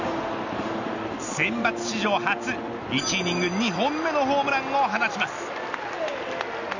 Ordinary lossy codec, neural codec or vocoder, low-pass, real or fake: none; none; 7.2 kHz; real